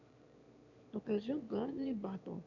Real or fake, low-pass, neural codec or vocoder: fake; 7.2 kHz; autoencoder, 22.05 kHz, a latent of 192 numbers a frame, VITS, trained on one speaker